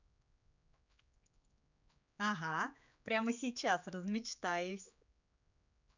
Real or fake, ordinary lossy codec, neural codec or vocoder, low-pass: fake; none; codec, 16 kHz, 4 kbps, X-Codec, HuBERT features, trained on general audio; 7.2 kHz